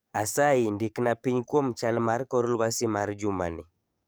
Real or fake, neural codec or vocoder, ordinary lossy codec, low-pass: fake; codec, 44.1 kHz, 7.8 kbps, DAC; none; none